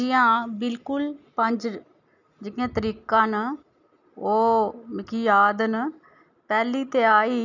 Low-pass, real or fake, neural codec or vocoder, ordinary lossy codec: 7.2 kHz; real; none; none